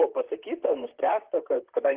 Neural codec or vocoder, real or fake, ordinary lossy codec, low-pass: vocoder, 44.1 kHz, 128 mel bands, Pupu-Vocoder; fake; Opus, 16 kbps; 3.6 kHz